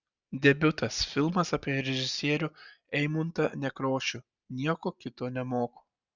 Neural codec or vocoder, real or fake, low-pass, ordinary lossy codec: none; real; 7.2 kHz; Opus, 64 kbps